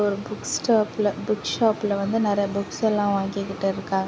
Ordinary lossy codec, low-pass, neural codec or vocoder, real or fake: none; none; none; real